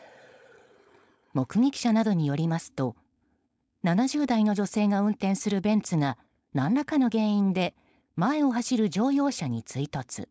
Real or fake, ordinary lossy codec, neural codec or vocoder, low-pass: fake; none; codec, 16 kHz, 4.8 kbps, FACodec; none